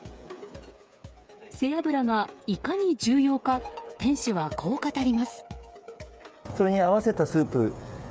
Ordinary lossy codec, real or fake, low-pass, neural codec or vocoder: none; fake; none; codec, 16 kHz, 8 kbps, FreqCodec, smaller model